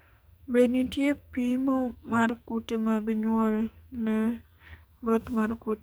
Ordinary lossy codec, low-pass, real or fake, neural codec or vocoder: none; none; fake; codec, 44.1 kHz, 2.6 kbps, SNAC